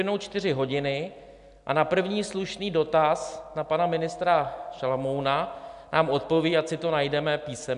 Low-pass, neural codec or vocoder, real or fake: 10.8 kHz; none; real